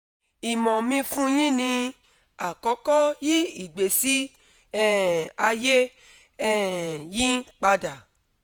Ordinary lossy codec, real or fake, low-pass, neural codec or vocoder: none; fake; none; vocoder, 48 kHz, 128 mel bands, Vocos